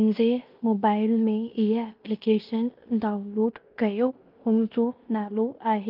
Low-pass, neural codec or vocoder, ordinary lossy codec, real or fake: 5.4 kHz; codec, 16 kHz in and 24 kHz out, 0.9 kbps, LongCat-Audio-Codec, fine tuned four codebook decoder; Opus, 24 kbps; fake